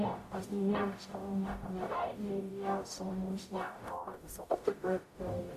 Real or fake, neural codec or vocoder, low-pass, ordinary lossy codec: fake; codec, 44.1 kHz, 0.9 kbps, DAC; 14.4 kHz; MP3, 64 kbps